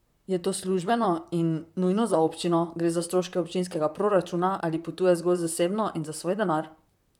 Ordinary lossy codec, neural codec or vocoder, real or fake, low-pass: none; vocoder, 44.1 kHz, 128 mel bands, Pupu-Vocoder; fake; 19.8 kHz